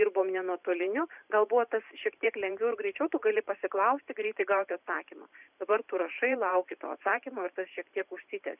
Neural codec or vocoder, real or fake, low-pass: vocoder, 44.1 kHz, 128 mel bands every 512 samples, BigVGAN v2; fake; 3.6 kHz